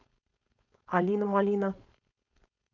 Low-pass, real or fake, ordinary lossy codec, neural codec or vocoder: 7.2 kHz; fake; none; codec, 16 kHz, 4.8 kbps, FACodec